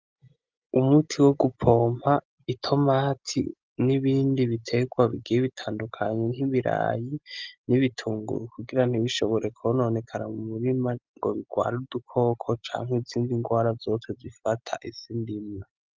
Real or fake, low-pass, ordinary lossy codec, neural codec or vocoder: real; 7.2 kHz; Opus, 24 kbps; none